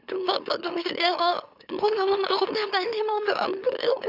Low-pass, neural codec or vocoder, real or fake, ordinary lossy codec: 5.4 kHz; autoencoder, 44.1 kHz, a latent of 192 numbers a frame, MeloTTS; fake; none